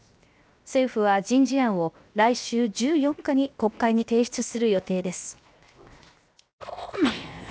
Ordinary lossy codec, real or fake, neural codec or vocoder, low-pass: none; fake; codec, 16 kHz, 0.7 kbps, FocalCodec; none